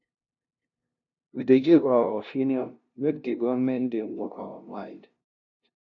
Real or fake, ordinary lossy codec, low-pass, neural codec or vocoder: fake; none; 7.2 kHz; codec, 16 kHz, 0.5 kbps, FunCodec, trained on LibriTTS, 25 frames a second